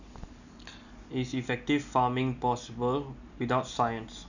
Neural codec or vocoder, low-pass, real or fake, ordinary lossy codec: none; 7.2 kHz; real; none